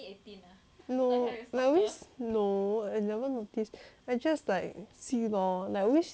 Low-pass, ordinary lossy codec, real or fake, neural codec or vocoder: none; none; real; none